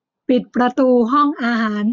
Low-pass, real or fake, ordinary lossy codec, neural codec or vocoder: 7.2 kHz; real; none; none